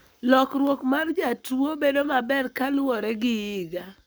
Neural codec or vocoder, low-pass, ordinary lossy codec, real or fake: codec, 44.1 kHz, 7.8 kbps, Pupu-Codec; none; none; fake